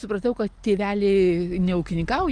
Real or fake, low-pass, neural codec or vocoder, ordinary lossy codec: real; 9.9 kHz; none; Opus, 24 kbps